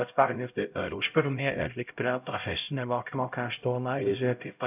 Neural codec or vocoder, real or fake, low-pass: codec, 16 kHz, 0.5 kbps, X-Codec, HuBERT features, trained on LibriSpeech; fake; 3.6 kHz